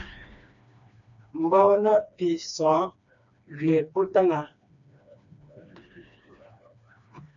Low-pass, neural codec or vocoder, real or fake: 7.2 kHz; codec, 16 kHz, 2 kbps, FreqCodec, smaller model; fake